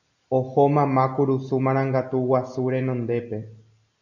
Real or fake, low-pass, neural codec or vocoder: real; 7.2 kHz; none